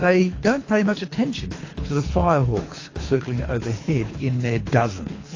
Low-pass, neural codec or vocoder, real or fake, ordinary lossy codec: 7.2 kHz; codec, 24 kHz, 3 kbps, HILCodec; fake; AAC, 32 kbps